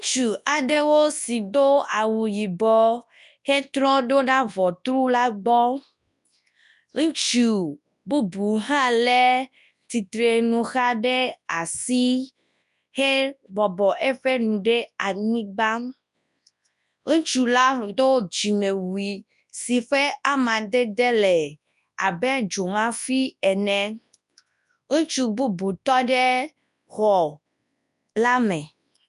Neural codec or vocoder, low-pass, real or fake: codec, 24 kHz, 0.9 kbps, WavTokenizer, large speech release; 10.8 kHz; fake